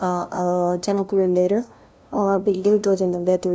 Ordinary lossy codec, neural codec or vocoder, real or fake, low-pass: none; codec, 16 kHz, 0.5 kbps, FunCodec, trained on LibriTTS, 25 frames a second; fake; none